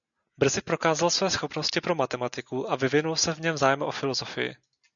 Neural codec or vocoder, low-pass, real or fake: none; 7.2 kHz; real